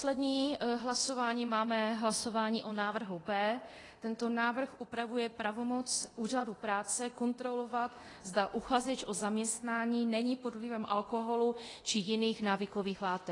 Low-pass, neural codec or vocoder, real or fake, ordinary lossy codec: 10.8 kHz; codec, 24 kHz, 0.9 kbps, DualCodec; fake; AAC, 32 kbps